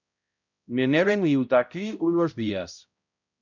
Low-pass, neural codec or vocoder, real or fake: 7.2 kHz; codec, 16 kHz, 0.5 kbps, X-Codec, HuBERT features, trained on balanced general audio; fake